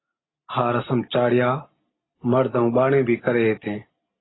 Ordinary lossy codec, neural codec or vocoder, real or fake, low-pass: AAC, 16 kbps; none; real; 7.2 kHz